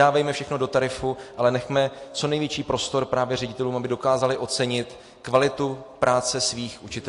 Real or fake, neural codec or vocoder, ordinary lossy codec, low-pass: real; none; AAC, 48 kbps; 10.8 kHz